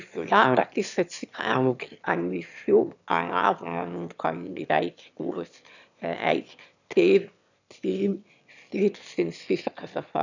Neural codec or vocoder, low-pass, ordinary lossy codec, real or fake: autoencoder, 22.05 kHz, a latent of 192 numbers a frame, VITS, trained on one speaker; 7.2 kHz; none; fake